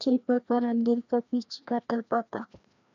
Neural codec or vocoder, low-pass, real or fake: codec, 16 kHz, 1 kbps, FreqCodec, larger model; 7.2 kHz; fake